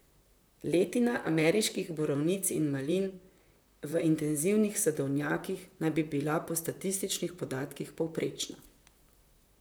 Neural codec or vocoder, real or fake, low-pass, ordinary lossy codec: vocoder, 44.1 kHz, 128 mel bands, Pupu-Vocoder; fake; none; none